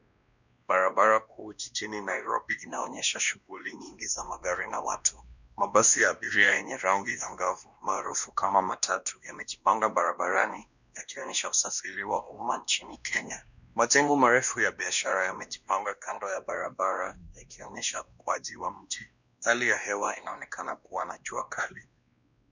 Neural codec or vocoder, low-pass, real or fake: codec, 16 kHz, 1 kbps, X-Codec, WavLM features, trained on Multilingual LibriSpeech; 7.2 kHz; fake